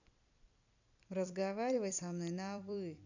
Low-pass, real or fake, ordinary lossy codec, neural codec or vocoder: 7.2 kHz; fake; none; vocoder, 44.1 kHz, 128 mel bands every 256 samples, BigVGAN v2